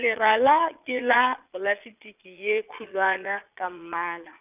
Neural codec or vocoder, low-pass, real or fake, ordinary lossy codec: vocoder, 22.05 kHz, 80 mel bands, Vocos; 3.6 kHz; fake; none